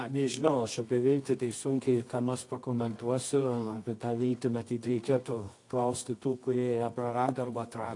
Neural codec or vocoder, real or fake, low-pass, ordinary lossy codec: codec, 24 kHz, 0.9 kbps, WavTokenizer, medium music audio release; fake; 10.8 kHz; AAC, 48 kbps